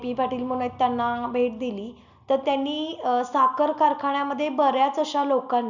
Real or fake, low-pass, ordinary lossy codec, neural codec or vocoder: real; 7.2 kHz; none; none